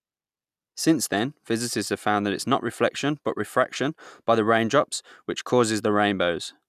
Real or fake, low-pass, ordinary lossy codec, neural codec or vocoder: real; 14.4 kHz; none; none